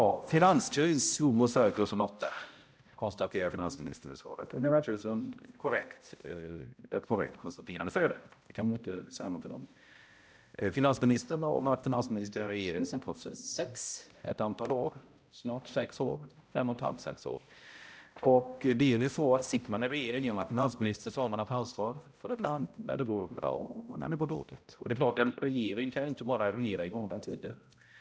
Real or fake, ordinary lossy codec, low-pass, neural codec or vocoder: fake; none; none; codec, 16 kHz, 0.5 kbps, X-Codec, HuBERT features, trained on balanced general audio